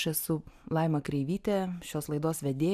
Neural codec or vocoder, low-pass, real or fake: none; 14.4 kHz; real